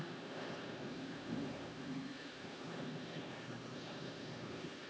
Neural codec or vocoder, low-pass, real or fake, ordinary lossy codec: codec, 16 kHz, 1 kbps, X-Codec, HuBERT features, trained on LibriSpeech; none; fake; none